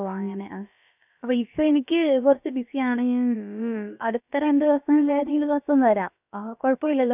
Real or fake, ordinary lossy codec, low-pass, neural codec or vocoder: fake; none; 3.6 kHz; codec, 16 kHz, about 1 kbps, DyCAST, with the encoder's durations